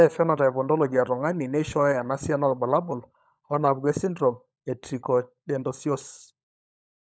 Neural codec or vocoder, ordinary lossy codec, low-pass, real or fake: codec, 16 kHz, 16 kbps, FunCodec, trained on LibriTTS, 50 frames a second; none; none; fake